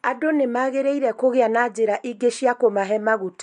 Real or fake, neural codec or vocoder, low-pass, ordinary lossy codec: real; none; 10.8 kHz; MP3, 64 kbps